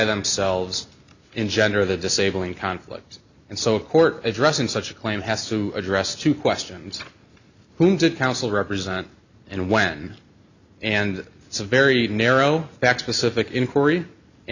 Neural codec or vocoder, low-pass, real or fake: none; 7.2 kHz; real